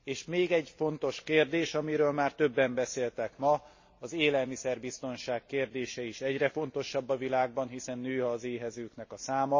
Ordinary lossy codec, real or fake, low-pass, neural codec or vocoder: MP3, 32 kbps; real; 7.2 kHz; none